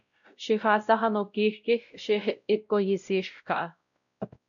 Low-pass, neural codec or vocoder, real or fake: 7.2 kHz; codec, 16 kHz, 0.5 kbps, X-Codec, WavLM features, trained on Multilingual LibriSpeech; fake